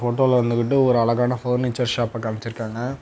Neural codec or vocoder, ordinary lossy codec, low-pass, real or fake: none; none; none; real